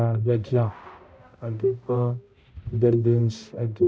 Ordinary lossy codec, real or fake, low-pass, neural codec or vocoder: none; fake; none; codec, 16 kHz, 0.5 kbps, X-Codec, HuBERT features, trained on balanced general audio